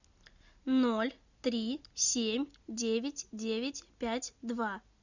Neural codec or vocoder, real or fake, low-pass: none; real; 7.2 kHz